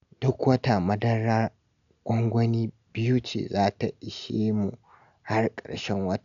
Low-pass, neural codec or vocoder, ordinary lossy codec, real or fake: 7.2 kHz; none; none; real